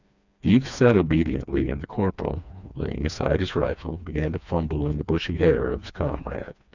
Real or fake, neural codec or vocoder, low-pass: fake; codec, 16 kHz, 2 kbps, FreqCodec, smaller model; 7.2 kHz